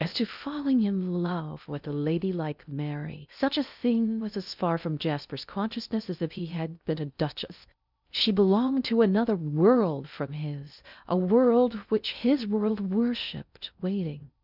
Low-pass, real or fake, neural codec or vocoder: 5.4 kHz; fake; codec, 16 kHz in and 24 kHz out, 0.6 kbps, FocalCodec, streaming, 2048 codes